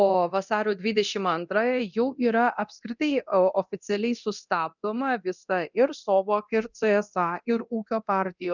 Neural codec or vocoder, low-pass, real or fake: codec, 24 kHz, 0.9 kbps, DualCodec; 7.2 kHz; fake